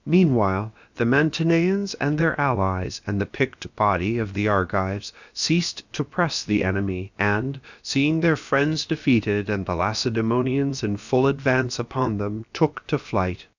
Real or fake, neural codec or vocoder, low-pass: fake; codec, 16 kHz, about 1 kbps, DyCAST, with the encoder's durations; 7.2 kHz